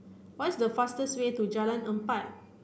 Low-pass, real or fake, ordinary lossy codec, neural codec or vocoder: none; real; none; none